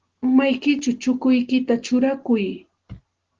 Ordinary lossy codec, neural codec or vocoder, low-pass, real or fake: Opus, 16 kbps; none; 7.2 kHz; real